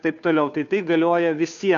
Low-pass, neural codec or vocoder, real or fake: 7.2 kHz; codec, 16 kHz, 2 kbps, FunCodec, trained on Chinese and English, 25 frames a second; fake